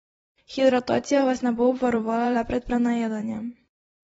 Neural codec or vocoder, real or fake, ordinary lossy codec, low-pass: vocoder, 44.1 kHz, 128 mel bands every 512 samples, BigVGAN v2; fake; AAC, 24 kbps; 19.8 kHz